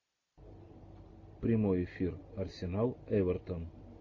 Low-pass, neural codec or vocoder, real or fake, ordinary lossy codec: 7.2 kHz; none; real; AAC, 48 kbps